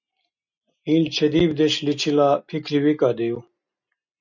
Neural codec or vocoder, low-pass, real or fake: none; 7.2 kHz; real